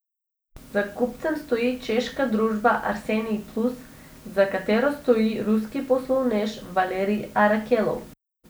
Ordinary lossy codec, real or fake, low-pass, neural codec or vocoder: none; real; none; none